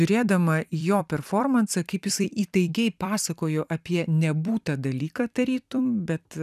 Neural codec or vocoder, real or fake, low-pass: vocoder, 44.1 kHz, 128 mel bands every 256 samples, BigVGAN v2; fake; 14.4 kHz